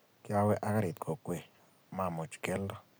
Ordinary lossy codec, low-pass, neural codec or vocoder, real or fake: none; none; none; real